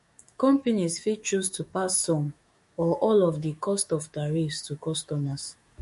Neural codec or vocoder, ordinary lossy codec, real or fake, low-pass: autoencoder, 48 kHz, 128 numbers a frame, DAC-VAE, trained on Japanese speech; MP3, 48 kbps; fake; 14.4 kHz